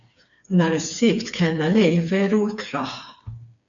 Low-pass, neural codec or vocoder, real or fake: 7.2 kHz; codec, 16 kHz, 4 kbps, FreqCodec, smaller model; fake